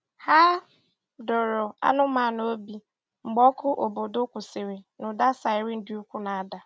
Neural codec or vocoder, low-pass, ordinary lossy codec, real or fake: none; none; none; real